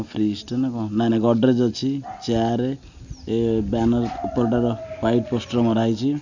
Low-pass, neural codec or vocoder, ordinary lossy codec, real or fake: 7.2 kHz; none; none; real